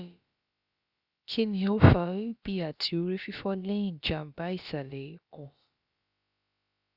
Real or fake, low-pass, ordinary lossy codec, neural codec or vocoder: fake; 5.4 kHz; Opus, 64 kbps; codec, 16 kHz, about 1 kbps, DyCAST, with the encoder's durations